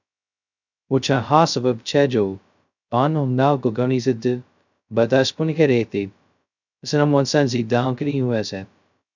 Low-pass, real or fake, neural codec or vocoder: 7.2 kHz; fake; codec, 16 kHz, 0.2 kbps, FocalCodec